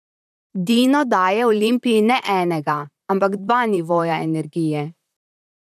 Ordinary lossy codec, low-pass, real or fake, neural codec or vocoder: none; 14.4 kHz; fake; vocoder, 44.1 kHz, 128 mel bands, Pupu-Vocoder